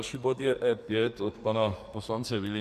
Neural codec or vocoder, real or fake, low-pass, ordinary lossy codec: codec, 44.1 kHz, 2.6 kbps, SNAC; fake; 14.4 kHz; MP3, 96 kbps